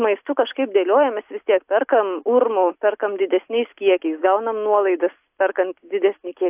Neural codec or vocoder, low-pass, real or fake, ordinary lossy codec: none; 3.6 kHz; real; AAC, 32 kbps